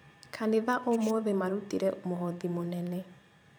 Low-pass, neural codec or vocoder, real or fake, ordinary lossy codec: none; vocoder, 44.1 kHz, 128 mel bands every 512 samples, BigVGAN v2; fake; none